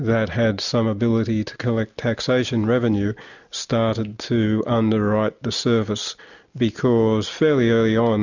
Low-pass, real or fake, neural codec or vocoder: 7.2 kHz; real; none